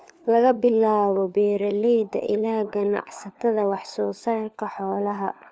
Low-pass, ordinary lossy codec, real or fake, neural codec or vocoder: none; none; fake; codec, 16 kHz, 4 kbps, FunCodec, trained on LibriTTS, 50 frames a second